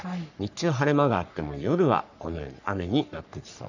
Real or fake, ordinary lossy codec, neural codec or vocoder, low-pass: fake; none; codec, 44.1 kHz, 3.4 kbps, Pupu-Codec; 7.2 kHz